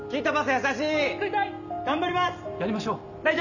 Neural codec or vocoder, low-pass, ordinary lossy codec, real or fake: none; 7.2 kHz; none; real